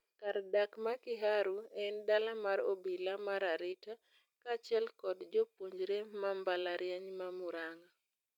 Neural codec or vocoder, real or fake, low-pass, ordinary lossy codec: vocoder, 44.1 kHz, 128 mel bands every 256 samples, BigVGAN v2; fake; 19.8 kHz; none